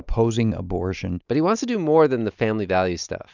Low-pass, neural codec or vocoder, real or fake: 7.2 kHz; none; real